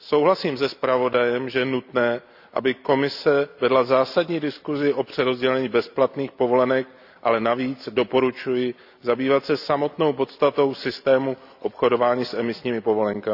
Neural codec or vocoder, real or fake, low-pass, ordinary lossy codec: none; real; 5.4 kHz; none